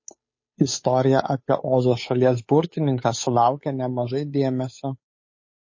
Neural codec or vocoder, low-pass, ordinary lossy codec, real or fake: codec, 16 kHz, 8 kbps, FunCodec, trained on Chinese and English, 25 frames a second; 7.2 kHz; MP3, 32 kbps; fake